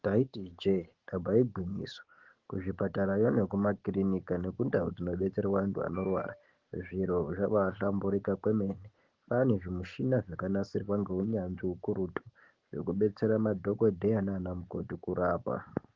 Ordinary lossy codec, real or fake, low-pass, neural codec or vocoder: Opus, 16 kbps; real; 7.2 kHz; none